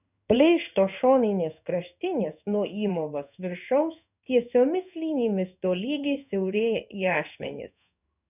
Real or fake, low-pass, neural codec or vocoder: fake; 3.6 kHz; codec, 16 kHz in and 24 kHz out, 1 kbps, XY-Tokenizer